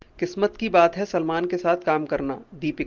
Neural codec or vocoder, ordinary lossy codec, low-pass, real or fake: none; Opus, 32 kbps; 7.2 kHz; real